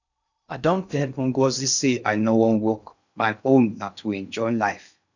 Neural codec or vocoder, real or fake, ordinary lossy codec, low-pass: codec, 16 kHz in and 24 kHz out, 0.6 kbps, FocalCodec, streaming, 2048 codes; fake; none; 7.2 kHz